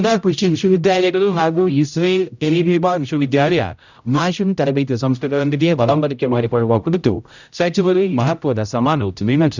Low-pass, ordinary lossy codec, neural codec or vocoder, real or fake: 7.2 kHz; none; codec, 16 kHz, 0.5 kbps, X-Codec, HuBERT features, trained on general audio; fake